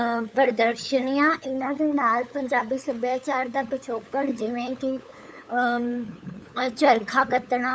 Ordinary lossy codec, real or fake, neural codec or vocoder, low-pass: none; fake; codec, 16 kHz, 8 kbps, FunCodec, trained on LibriTTS, 25 frames a second; none